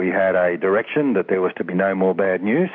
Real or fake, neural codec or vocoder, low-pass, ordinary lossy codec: real; none; 7.2 kHz; MP3, 48 kbps